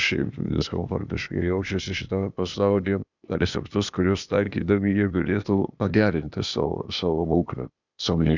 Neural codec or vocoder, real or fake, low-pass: codec, 16 kHz, 0.8 kbps, ZipCodec; fake; 7.2 kHz